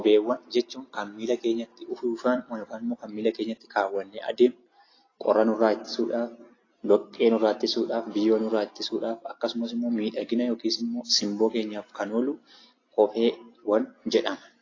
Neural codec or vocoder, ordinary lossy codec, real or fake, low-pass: none; AAC, 32 kbps; real; 7.2 kHz